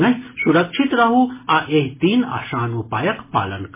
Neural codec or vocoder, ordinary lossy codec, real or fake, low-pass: none; MP3, 16 kbps; real; 3.6 kHz